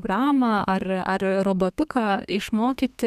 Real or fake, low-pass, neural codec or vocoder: fake; 14.4 kHz; codec, 32 kHz, 1.9 kbps, SNAC